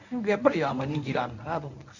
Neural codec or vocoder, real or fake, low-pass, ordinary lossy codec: codec, 24 kHz, 0.9 kbps, WavTokenizer, medium speech release version 1; fake; 7.2 kHz; none